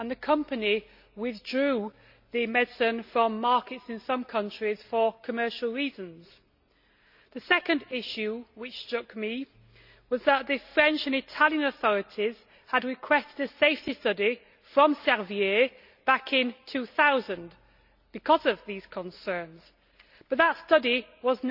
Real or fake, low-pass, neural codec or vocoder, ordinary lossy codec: real; 5.4 kHz; none; none